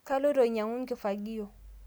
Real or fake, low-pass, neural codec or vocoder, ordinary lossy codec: real; none; none; none